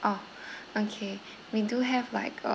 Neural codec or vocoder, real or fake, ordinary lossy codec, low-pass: none; real; none; none